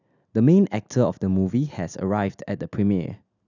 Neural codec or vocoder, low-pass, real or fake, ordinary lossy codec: none; 7.2 kHz; real; none